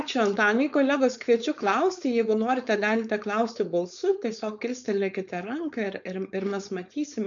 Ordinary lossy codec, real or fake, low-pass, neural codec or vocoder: MP3, 96 kbps; fake; 7.2 kHz; codec, 16 kHz, 4.8 kbps, FACodec